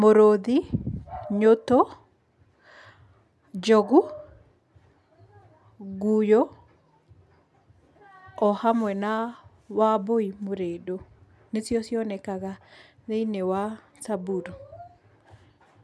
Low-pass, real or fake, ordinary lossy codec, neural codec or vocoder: none; real; none; none